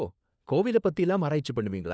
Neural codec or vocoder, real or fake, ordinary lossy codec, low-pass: codec, 16 kHz, 4 kbps, FunCodec, trained on LibriTTS, 50 frames a second; fake; none; none